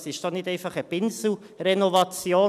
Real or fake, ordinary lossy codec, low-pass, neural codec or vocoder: real; none; 14.4 kHz; none